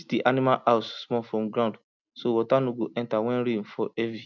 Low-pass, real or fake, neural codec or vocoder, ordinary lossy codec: 7.2 kHz; real; none; none